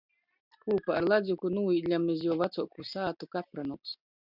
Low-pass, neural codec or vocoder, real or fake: 5.4 kHz; none; real